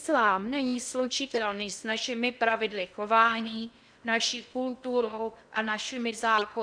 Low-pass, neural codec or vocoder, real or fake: 9.9 kHz; codec, 16 kHz in and 24 kHz out, 0.6 kbps, FocalCodec, streaming, 2048 codes; fake